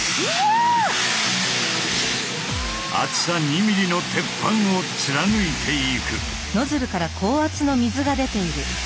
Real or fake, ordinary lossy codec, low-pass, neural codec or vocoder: real; none; none; none